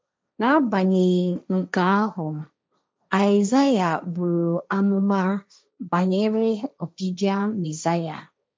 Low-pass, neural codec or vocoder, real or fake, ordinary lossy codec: none; codec, 16 kHz, 1.1 kbps, Voila-Tokenizer; fake; none